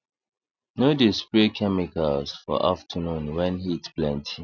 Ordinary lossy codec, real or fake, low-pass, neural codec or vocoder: none; real; 7.2 kHz; none